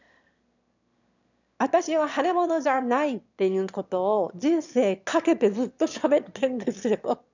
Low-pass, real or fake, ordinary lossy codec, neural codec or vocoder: 7.2 kHz; fake; none; autoencoder, 22.05 kHz, a latent of 192 numbers a frame, VITS, trained on one speaker